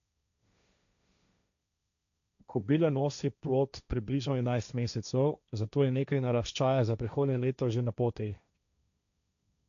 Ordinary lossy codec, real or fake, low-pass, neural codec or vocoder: none; fake; 7.2 kHz; codec, 16 kHz, 1.1 kbps, Voila-Tokenizer